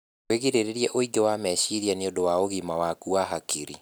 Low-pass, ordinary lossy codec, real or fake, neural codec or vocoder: none; none; real; none